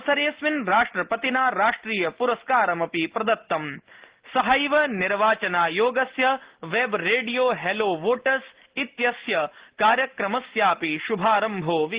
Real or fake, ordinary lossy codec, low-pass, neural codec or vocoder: real; Opus, 16 kbps; 3.6 kHz; none